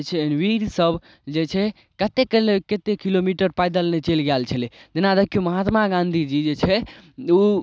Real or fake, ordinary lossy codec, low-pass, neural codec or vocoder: real; none; none; none